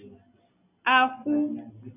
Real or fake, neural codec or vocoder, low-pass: real; none; 3.6 kHz